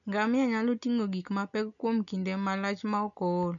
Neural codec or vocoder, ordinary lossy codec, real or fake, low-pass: none; none; real; 7.2 kHz